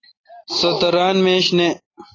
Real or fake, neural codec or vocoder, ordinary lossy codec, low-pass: real; none; AAC, 32 kbps; 7.2 kHz